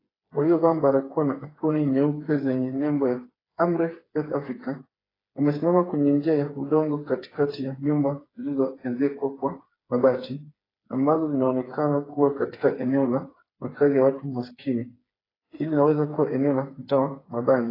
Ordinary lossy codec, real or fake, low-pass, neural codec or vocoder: AAC, 24 kbps; fake; 5.4 kHz; codec, 16 kHz, 4 kbps, FreqCodec, smaller model